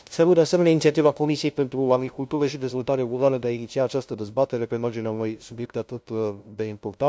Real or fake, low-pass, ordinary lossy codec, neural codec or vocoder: fake; none; none; codec, 16 kHz, 0.5 kbps, FunCodec, trained on LibriTTS, 25 frames a second